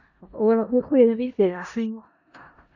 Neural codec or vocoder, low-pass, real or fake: codec, 16 kHz in and 24 kHz out, 0.4 kbps, LongCat-Audio-Codec, four codebook decoder; 7.2 kHz; fake